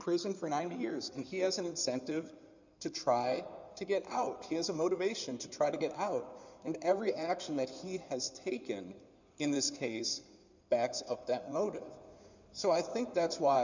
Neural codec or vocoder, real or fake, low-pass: codec, 16 kHz in and 24 kHz out, 2.2 kbps, FireRedTTS-2 codec; fake; 7.2 kHz